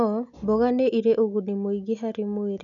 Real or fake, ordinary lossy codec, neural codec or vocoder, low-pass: real; none; none; 7.2 kHz